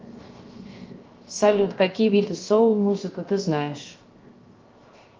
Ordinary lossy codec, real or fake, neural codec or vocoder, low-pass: Opus, 24 kbps; fake; codec, 16 kHz, 0.7 kbps, FocalCodec; 7.2 kHz